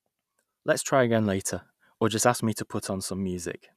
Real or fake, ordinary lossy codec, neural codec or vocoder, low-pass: real; none; none; 14.4 kHz